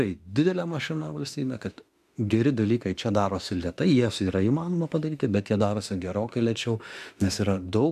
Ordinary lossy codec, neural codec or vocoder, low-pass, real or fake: MP3, 96 kbps; autoencoder, 48 kHz, 32 numbers a frame, DAC-VAE, trained on Japanese speech; 14.4 kHz; fake